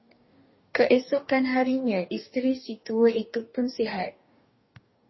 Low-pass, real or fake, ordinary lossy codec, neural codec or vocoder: 7.2 kHz; fake; MP3, 24 kbps; codec, 44.1 kHz, 2.6 kbps, DAC